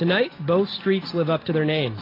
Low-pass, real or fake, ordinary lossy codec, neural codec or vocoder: 5.4 kHz; real; AAC, 24 kbps; none